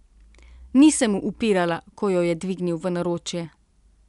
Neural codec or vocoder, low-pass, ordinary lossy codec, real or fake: none; 10.8 kHz; none; real